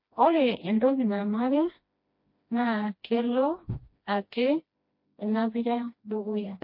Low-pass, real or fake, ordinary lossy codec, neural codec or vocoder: 5.4 kHz; fake; MP3, 32 kbps; codec, 16 kHz, 1 kbps, FreqCodec, smaller model